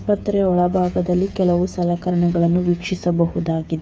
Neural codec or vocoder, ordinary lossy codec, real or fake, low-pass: codec, 16 kHz, 8 kbps, FreqCodec, smaller model; none; fake; none